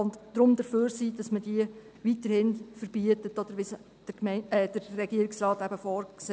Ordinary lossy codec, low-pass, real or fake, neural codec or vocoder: none; none; real; none